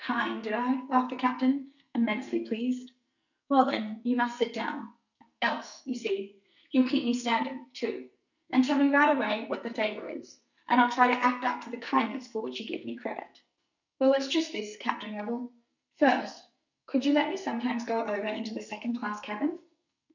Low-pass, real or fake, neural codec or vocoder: 7.2 kHz; fake; codec, 44.1 kHz, 2.6 kbps, SNAC